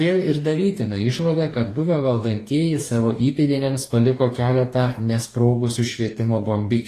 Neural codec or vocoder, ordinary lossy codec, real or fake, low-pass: codec, 44.1 kHz, 2.6 kbps, DAC; AAC, 48 kbps; fake; 14.4 kHz